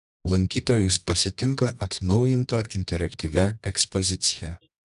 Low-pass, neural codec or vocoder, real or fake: 10.8 kHz; codec, 24 kHz, 0.9 kbps, WavTokenizer, medium music audio release; fake